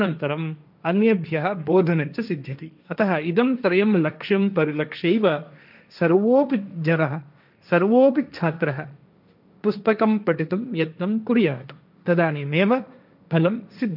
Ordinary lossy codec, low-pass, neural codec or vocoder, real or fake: none; 5.4 kHz; codec, 16 kHz, 1.1 kbps, Voila-Tokenizer; fake